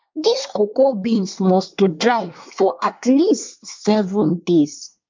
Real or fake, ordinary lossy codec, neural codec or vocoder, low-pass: fake; MP3, 64 kbps; codec, 24 kHz, 1 kbps, SNAC; 7.2 kHz